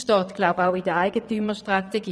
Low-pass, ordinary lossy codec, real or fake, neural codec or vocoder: none; none; fake; vocoder, 22.05 kHz, 80 mel bands, Vocos